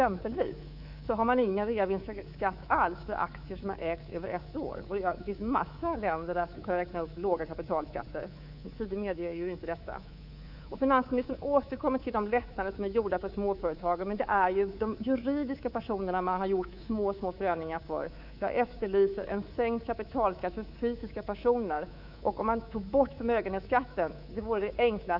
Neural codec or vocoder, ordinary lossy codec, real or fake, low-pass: codec, 24 kHz, 3.1 kbps, DualCodec; Opus, 64 kbps; fake; 5.4 kHz